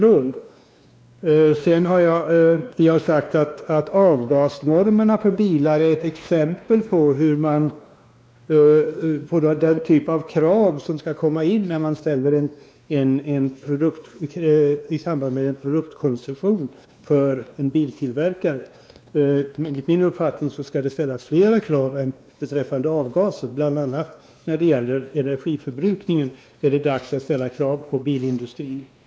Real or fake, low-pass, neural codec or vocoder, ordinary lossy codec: fake; none; codec, 16 kHz, 2 kbps, X-Codec, WavLM features, trained on Multilingual LibriSpeech; none